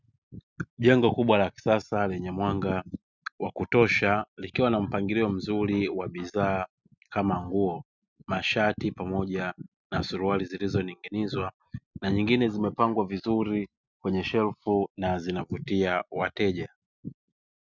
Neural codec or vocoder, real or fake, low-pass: none; real; 7.2 kHz